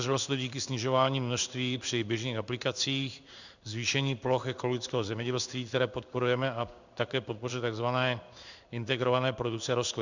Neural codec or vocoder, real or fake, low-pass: codec, 16 kHz in and 24 kHz out, 1 kbps, XY-Tokenizer; fake; 7.2 kHz